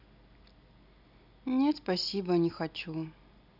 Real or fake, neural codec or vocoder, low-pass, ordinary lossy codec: real; none; 5.4 kHz; none